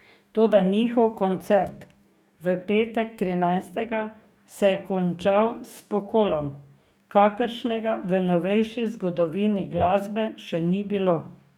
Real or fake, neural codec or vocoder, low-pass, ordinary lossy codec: fake; codec, 44.1 kHz, 2.6 kbps, DAC; 19.8 kHz; none